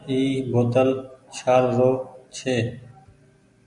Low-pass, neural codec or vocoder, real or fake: 10.8 kHz; none; real